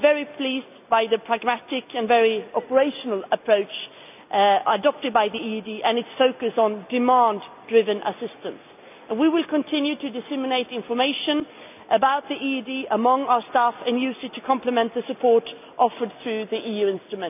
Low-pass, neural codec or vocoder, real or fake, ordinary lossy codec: 3.6 kHz; none; real; none